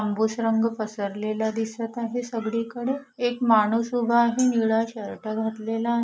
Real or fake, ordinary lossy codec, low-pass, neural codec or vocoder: real; none; none; none